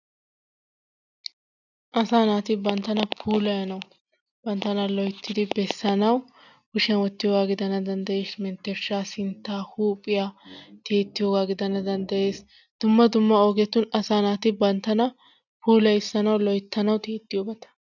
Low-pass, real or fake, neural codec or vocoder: 7.2 kHz; real; none